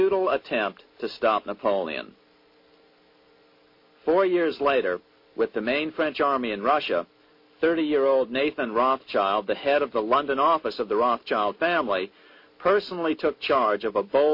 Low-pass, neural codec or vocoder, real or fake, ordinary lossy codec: 5.4 kHz; none; real; MP3, 32 kbps